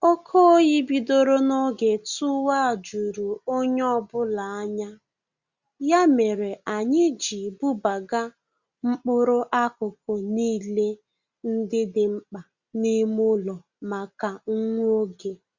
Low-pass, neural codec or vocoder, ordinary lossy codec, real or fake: 7.2 kHz; none; Opus, 64 kbps; real